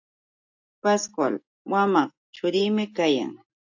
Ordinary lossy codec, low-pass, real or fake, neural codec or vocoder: AAC, 48 kbps; 7.2 kHz; real; none